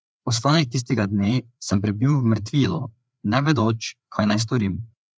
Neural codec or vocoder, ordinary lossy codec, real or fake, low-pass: codec, 16 kHz, 4 kbps, FreqCodec, larger model; none; fake; none